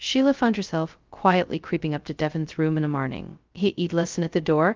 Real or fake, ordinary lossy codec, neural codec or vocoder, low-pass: fake; Opus, 32 kbps; codec, 16 kHz, 0.2 kbps, FocalCodec; 7.2 kHz